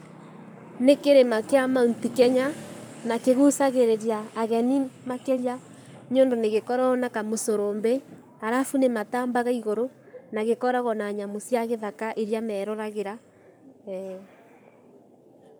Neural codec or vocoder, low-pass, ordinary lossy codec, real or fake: codec, 44.1 kHz, 7.8 kbps, Pupu-Codec; none; none; fake